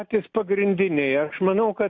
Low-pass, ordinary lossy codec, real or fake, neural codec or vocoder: 7.2 kHz; MP3, 48 kbps; real; none